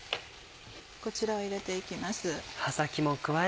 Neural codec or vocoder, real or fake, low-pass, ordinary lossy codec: none; real; none; none